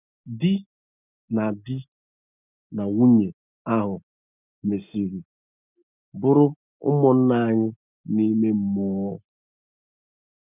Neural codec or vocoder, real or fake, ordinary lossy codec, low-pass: none; real; none; 3.6 kHz